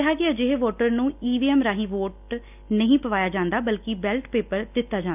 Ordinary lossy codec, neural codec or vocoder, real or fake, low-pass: none; none; real; 3.6 kHz